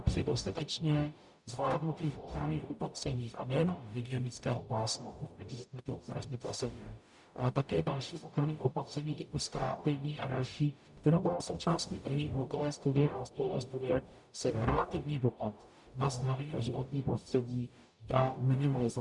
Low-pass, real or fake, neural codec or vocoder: 10.8 kHz; fake; codec, 44.1 kHz, 0.9 kbps, DAC